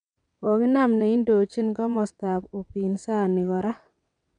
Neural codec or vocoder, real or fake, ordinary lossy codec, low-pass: vocoder, 22.05 kHz, 80 mel bands, WaveNeXt; fake; none; 9.9 kHz